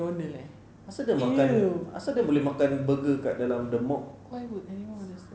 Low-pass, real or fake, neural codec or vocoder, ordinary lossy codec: none; real; none; none